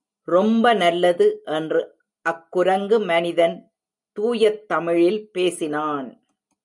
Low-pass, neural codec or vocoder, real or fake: 10.8 kHz; none; real